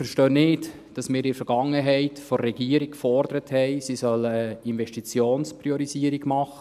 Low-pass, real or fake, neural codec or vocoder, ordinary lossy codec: 14.4 kHz; real; none; none